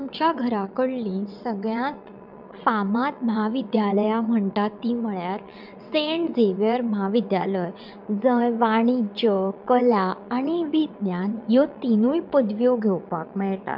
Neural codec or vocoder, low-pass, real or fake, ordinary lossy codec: vocoder, 22.05 kHz, 80 mel bands, WaveNeXt; 5.4 kHz; fake; none